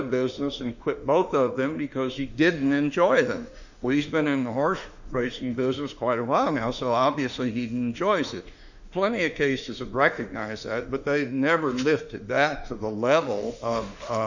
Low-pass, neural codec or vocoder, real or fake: 7.2 kHz; autoencoder, 48 kHz, 32 numbers a frame, DAC-VAE, trained on Japanese speech; fake